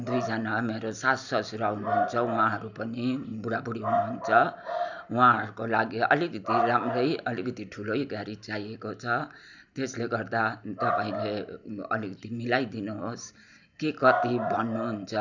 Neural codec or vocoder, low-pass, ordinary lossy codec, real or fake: none; 7.2 kHz; none; real